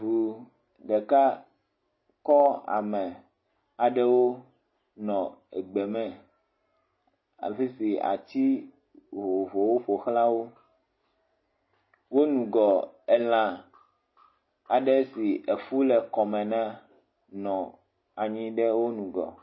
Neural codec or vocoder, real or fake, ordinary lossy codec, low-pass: none; real; MP3, 24 kbps; 7.2 kHz